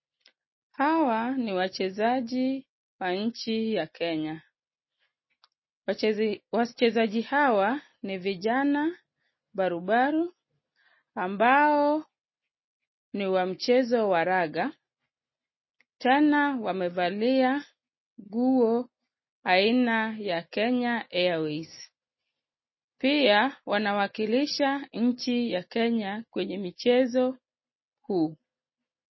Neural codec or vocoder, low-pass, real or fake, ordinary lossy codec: none; 7.2 kHz; real; MP3, 24 kbps